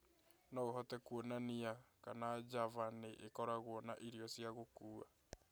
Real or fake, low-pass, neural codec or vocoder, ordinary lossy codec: real; none; none; none